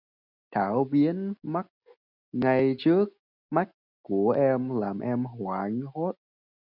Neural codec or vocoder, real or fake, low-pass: none; real; 5.4 kHz